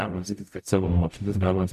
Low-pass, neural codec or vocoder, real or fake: 14.4 kHz; codec, 44.1 kHz, 0.9 kbps, DAC; fake